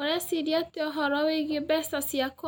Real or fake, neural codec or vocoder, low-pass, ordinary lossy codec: real; none; none; none